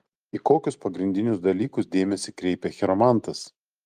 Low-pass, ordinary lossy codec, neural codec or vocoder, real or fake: 14.4 kHz; Opus, 32 kbps; none; real